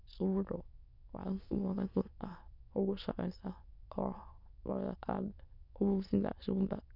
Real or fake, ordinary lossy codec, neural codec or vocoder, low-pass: fake; none; autoencoder, 22.05 kHz, a latent of 192 numbers a frame, VITS, trained on many speakers; 5.4 kHz